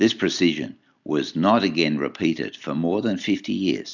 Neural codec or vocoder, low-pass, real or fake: none; 7.2 kHz; real